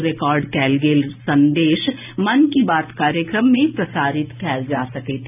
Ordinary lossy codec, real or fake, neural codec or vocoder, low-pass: none; real; none; 3.6 kHz